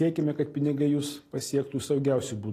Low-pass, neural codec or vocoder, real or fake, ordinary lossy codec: 14.4 kHz; none; real; AAC, 48 kbps